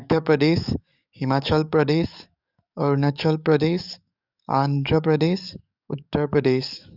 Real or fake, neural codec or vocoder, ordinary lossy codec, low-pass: fake; codec, 44.1 kHz, 7.8 kbps, DAC; none; 5.4 kHz